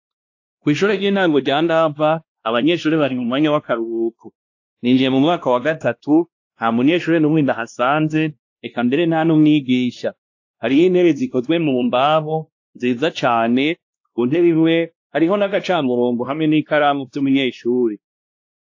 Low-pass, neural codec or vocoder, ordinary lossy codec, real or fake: 7.2 kHz; codec, 16 kHz, 1 kbps, X-Codec, WavLM features, trained on Multilingual LibriSpeech; AAC, 48 kbps; fake